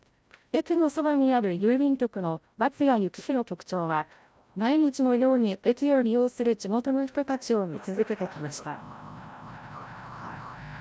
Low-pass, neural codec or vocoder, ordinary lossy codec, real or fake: none; codec, 16 kHz, 0.5 kbps, FreqCodec, larger model; none; fake